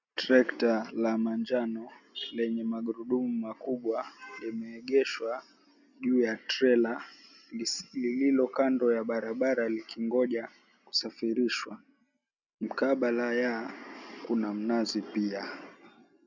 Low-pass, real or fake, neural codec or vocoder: 7.2 kHz; real; none